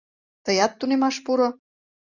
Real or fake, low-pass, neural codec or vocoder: real; 7.2 kHz; none